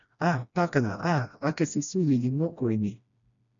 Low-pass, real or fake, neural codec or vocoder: 7.2 kHz; fake; codec, 16 kHz, 1 kbps, FreqCodec, smaller model